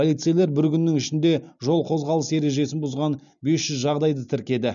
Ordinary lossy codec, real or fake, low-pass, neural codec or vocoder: MP3, 96 kbps; real; 7.2 kHz; none